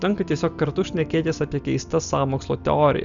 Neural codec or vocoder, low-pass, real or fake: none; 7.2 kHz; real